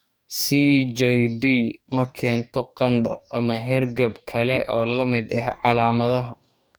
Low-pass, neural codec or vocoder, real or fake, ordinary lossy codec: none; codec, 44.1 kHz, 2.6 kbps, DAC; fake; none